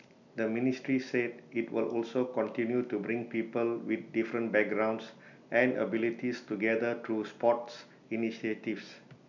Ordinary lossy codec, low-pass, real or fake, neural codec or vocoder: none; 7.2 kHz; real; none